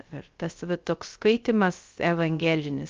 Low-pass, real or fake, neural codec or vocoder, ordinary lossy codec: 7.2 kHz; fake; codec, 16 kHz, 0.3 kbps, FocalCodec; Opus, 24 kbps